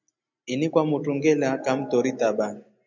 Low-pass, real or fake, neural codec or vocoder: 7.2 kHz; real; none